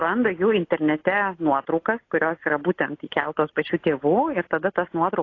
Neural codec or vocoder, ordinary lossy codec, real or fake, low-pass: none; AAC, 32 kbps; real; 7.2 kHz